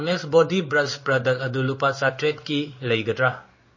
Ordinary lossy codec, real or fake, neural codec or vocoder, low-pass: MP3, 32 kbps; fake; codec, 16 kHz in and 24 kHz out, 1 kbps, XY-Tokenizer; 7.2 kHz